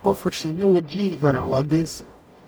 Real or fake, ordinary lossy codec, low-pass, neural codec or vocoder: fake; none; none; codec, 44.1 kHz, 0.9 kbps, DAC